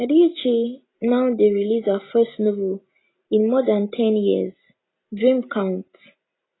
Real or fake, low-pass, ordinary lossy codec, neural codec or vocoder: real; 7.2 kHz; AAC, 16 kbps; none